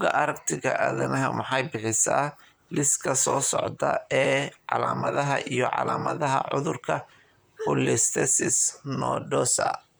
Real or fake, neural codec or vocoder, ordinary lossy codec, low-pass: fake; vocoder, 44.1 kHz, 128 mel bands, Pupu-Vocoder; none; none